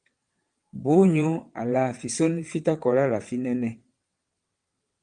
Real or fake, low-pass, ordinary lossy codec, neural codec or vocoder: fake; 9.9 kHz; Opus, 32 kbps; vocoder, 22.05 kHz, 80 mel bands, WaveNeXt